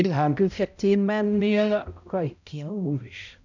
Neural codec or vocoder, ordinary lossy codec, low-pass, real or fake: codec, 16 kHz, 0.5 kbps, X-Codec, HuBERT features, trained on balanced general audio; none; 7.2 kHz; fake